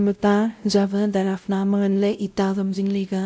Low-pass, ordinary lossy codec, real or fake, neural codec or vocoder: none; none; fake; codec, 16 kHz, 0.5 kbps, X-Codec, WavLM features, trained on Multilingual LibriSpeech